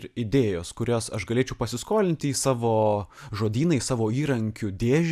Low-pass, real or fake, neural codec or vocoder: 14.4 kHz; real; none